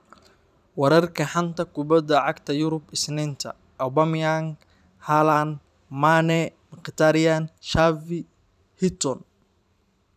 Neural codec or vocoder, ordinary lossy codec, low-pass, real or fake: vocoder, 44.1 kHz, 128 mel bands every 512 samples, BigVGAN v2; none; 14.4 kHz; fake